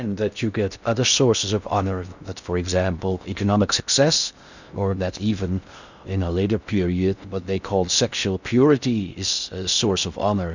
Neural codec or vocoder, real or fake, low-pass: codec, 16 kHz in and 24 kHz out, 0.6 kbps, FocalCodec, streaming, 2048 codes; fake; 7.2 kHz